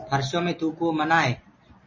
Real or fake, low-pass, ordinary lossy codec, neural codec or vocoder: real; 7.2 kHz; MP3, 32 kbps; none